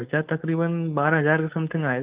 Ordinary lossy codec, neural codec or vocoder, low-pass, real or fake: Opus, 32 kbps; codec, 16 kHz, 4.8 kbps, FACodec; 3.6 kHz; fake